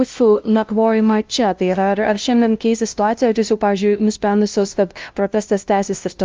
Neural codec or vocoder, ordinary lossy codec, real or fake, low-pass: codec, 16 kHz, 0.5 kbps, FunCodec, trained on LibriTTS, 25 frames a second; Opus, 24 kbps; fake; 7.2 kHz